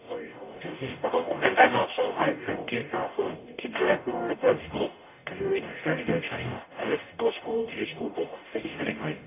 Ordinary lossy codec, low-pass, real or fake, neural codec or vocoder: none; 3.6 kHz; fake; codec, 44.1 kHz, 0.9 kbps, DAC